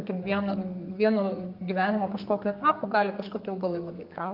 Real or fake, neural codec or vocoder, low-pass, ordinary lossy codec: fake; codec, 32 kHz, 1.9 kbps, SNAC; 5.4 kHz; Opus, 24 kbps